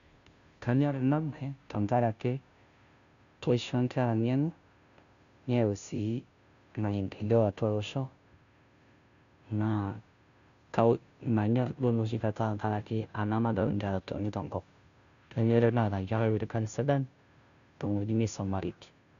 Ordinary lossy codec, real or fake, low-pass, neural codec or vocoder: none; fake; 7.2 kHz; codec, 16 kHz, 0.5 kbps, FunCodec, trained on Chinese and English, 25 frames a second